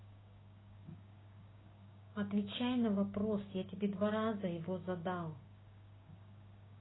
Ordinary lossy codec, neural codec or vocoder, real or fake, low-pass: AAC, 16 kbps; codec, 16 kHz, 6 kbps, DAC; fake; 7.2 kHz